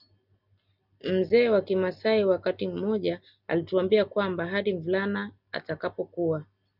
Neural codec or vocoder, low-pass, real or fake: none; 5.4 kHz; real